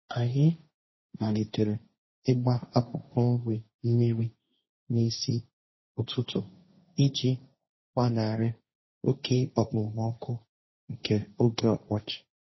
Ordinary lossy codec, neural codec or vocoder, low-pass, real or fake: MP3, 24 kbps; codec, 16 kHz, 1.1 kbps, Voila-Tokenizer; 7.2 kHz; fake